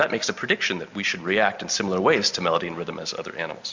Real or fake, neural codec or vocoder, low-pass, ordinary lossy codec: real; none; 7.2 kHz; MP3, 64 kbps